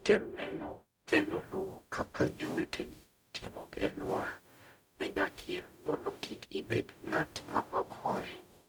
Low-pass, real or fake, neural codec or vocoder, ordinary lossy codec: none; fake; codec, 44.1 kHz, 0.9 kbps, DAC; none